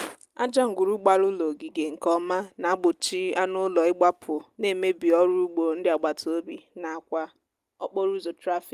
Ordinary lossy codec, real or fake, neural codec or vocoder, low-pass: Opus, 32 kbps; real; none; 14.4 kHz